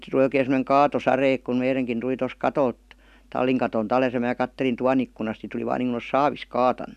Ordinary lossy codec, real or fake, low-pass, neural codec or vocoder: none; real; 14.4 kHz; none